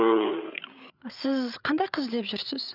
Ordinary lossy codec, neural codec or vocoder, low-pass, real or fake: none; codec, 16 kHz, 8 kbps, FreqCodec, larger model; 5.4 kHz; fake